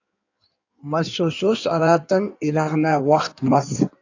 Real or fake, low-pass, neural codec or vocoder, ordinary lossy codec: fake; 7.2 kHz; codec, 16 kHz in and 24 kHz out, 1.1 kbps, FireRedTTS-2 codec; AAC, 48 kbps